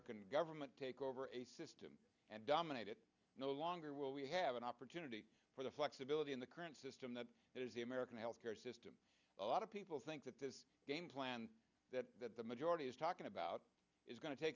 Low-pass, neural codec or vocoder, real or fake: 7.2 kHz; none; real